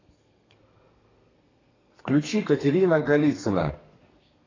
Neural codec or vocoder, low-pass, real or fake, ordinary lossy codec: codec, 32 kHz, 1.9 kbps, SNAC; 7.2 kHz; fake; AAC, 32 kbps